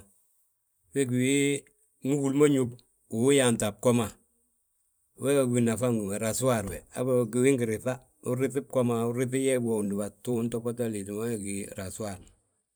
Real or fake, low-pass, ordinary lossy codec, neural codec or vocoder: fake; none; none; vocoder, 44.1 kHz, 128 mel bands every 512 samples, BigVGAN v2